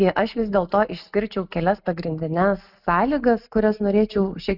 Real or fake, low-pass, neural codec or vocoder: real; 5.4 kHz; none